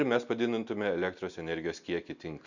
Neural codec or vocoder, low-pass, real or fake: none; 7.2 kHz; real